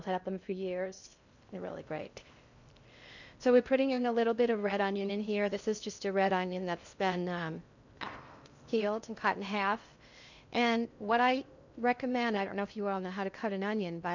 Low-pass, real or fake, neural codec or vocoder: 7.2 kHz; fake; codec, 16 kHz in and 24 kHz out, 0.6 kbps, FocalCodec, streaming, 2048 codes